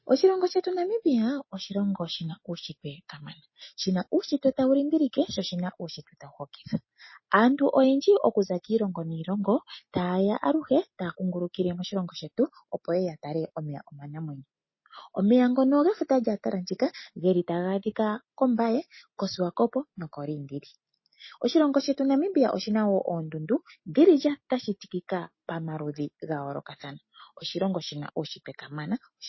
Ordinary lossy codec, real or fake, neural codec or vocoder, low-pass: MP3, 24 kbps; real; none; 7.2 kHz